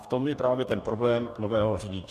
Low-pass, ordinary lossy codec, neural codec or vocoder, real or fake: 14.4 kHz; AAC, 96 kbps; codec, 44.1 kHz, 2.6 kbps, DAC; fake